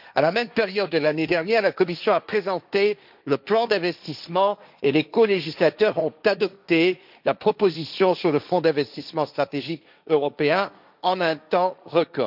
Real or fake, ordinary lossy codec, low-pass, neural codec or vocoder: fake; none; 5.4 kHz; codec, 16 kHz, 1.1 kbps, Voila-Tokenizer